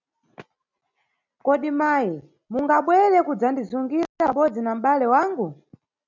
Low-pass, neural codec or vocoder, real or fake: 7.2 kHz; none; real